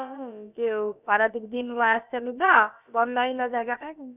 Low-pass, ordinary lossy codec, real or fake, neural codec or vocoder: 3.6 kHz; none; fake; codec, 16 kHz, about 1 kbps, DyCAST, with the encoder's durations